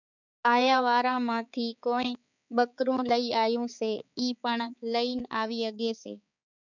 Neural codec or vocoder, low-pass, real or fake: codec, 16 kHz, 4 kbps, X-Codec, HuBERT features, trained on balanced general audio; 7.2 kHz; fake